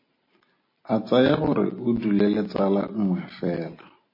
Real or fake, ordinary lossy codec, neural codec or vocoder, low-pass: real; MP3, 24 kbps; none; 5.4 kHz